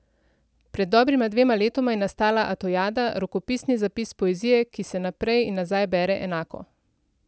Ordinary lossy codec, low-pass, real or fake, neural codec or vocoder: none; none; real; none